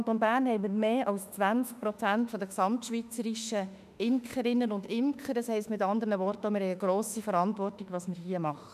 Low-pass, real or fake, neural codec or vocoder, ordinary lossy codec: 14.4 kHz; fake; autoencoder, 48 kHz, 32 numbers a frame, DAC-VAE, trained on Japanese speech; none